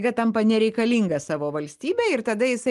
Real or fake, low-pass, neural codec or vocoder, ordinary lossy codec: real; 10.8 kHz; none; Opus, 24 kbps